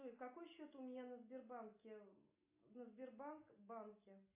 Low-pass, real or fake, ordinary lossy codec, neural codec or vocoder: 3.6 kHz; real; MP3, 24 kbps; none